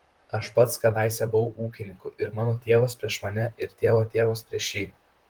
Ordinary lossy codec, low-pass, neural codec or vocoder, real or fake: Opus, 24 kbps; 19.8 kHz; vocoder, 44.1 kHz, 128 mel bands, Pupu-Vocoder; fake